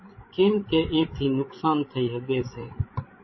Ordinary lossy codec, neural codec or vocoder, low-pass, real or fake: MP3, 24 kbps; codec, 16 kHz, 16 kbps, FreqCodec, larger model; 7.2 kHz; fake